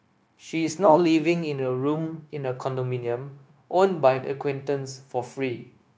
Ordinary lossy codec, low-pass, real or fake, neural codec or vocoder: none; none; fake; codec, 16 kHz, 0.9 kbps, LongCat-Audio-Codec